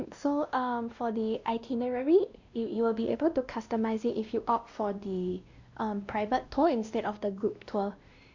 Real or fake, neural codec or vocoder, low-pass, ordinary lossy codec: fake; codec, 16 kHz, 1 kbps, X-Codec, WavLM features, trained on Multilingual LibriSpeech; 7.2 kHz; Opus, 64 kbps